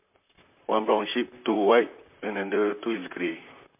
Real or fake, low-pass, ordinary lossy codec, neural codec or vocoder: fake; 3.6 kHz; MP3, 32 kbps; vocoder, 44.1 kHz, 128 mel bands, Pupu-Vocoder